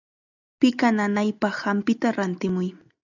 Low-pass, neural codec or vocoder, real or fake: 7.2 kHz; none; real